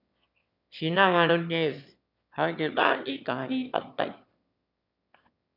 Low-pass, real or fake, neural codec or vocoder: 5.4 kHz; fake; autoencoder, 22.05 kHz, a latent of 192 numbers a frame, VITS, trained on one speaker